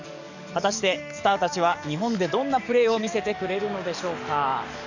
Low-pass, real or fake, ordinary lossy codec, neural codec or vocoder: 7.2 kHz; fake; none; codec, 44.1 kHz, 7.8 kbps, DAC